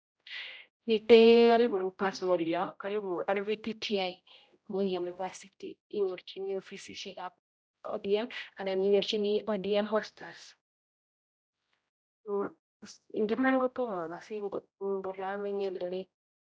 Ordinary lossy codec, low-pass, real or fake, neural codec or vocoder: none; none; fake; codec, 16 kHz, 0.5 kbps, X-Codec, HuBERT features, trained on general audio